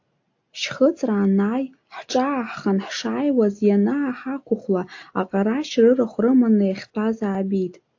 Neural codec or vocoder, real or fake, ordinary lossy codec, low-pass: none; real; AAC, 48 kbps; 7.2 kHz